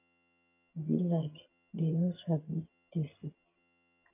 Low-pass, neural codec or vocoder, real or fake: 3.6 kHz; vocoder, 22.05 kHz, 80 mel bands, HiFi-GAN; fake